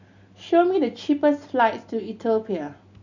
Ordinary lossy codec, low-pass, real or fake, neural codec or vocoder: none; 7.2 kHz; real; none